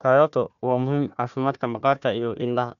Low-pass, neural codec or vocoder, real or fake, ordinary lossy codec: 7.2 kHz; codec, 16 kHz, 1 kbps, FunCodec, trained on Chinese and English, 50 frames a second; fake; none